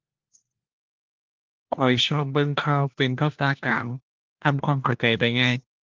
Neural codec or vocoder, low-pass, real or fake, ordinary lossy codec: codec, 16 kHz, 1 kbps, FunCodec, trained on LibriTTS, 50 frames a second; 7.2 kHz; fake; Opus, 24 kbps